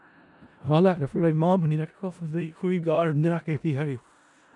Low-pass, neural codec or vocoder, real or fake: 10.8 kHz; codec, 16 kHz in and 24 kHz out, 0.4 kbps, LongCat-Audio-Codec, four codebook decoder; fake